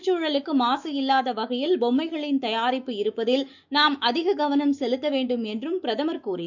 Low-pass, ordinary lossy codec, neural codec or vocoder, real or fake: 7.2 kHz; none; autoencoder, 48 kHz, 128 numbers a frame, DAC-VAE, trained on Japanese speech; fake